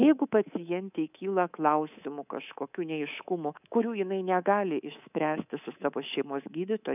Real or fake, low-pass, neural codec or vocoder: fake; 3.6 kHz; codec, 24 kHz, 3.1 kbps, DualCodec